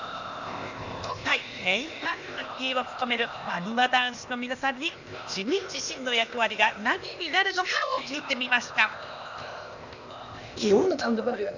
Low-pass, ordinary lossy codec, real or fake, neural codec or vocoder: 7.2 kHz; none; fake; codec, 16 kHz, 0.8 kbps, ZipCodec